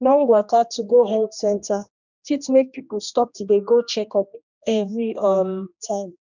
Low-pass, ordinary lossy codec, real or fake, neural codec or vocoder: 7.2 kHz; none; fake; codec, 16 kHz, 1 kbps, X-Codec, HuBERT features, trained on general audio